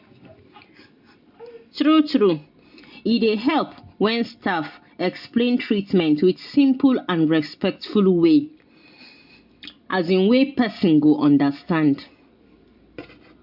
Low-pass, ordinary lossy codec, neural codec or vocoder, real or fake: 5.4 kHz; MP3, 48 kbps; none; real